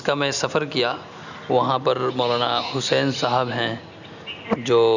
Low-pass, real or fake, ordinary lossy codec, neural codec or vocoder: 7.2 kHz; real; none; none